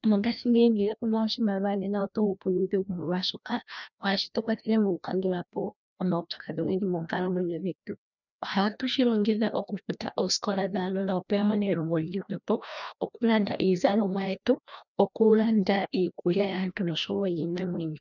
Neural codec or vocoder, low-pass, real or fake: codec, 16 kHz, 1 kbps, FreqCodec, larger model; 7.2 kHz; fake